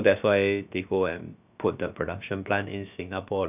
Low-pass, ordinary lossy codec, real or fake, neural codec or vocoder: 3.6 kHz; none; fake; codec, 16 kHz, about 1 kbps, DyCAST, with the encoder's durations